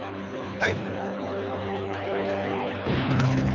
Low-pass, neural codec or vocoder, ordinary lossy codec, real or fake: 7.2 kHz; codec, 24 kHz, 3 kbps, HILCodec; none; fake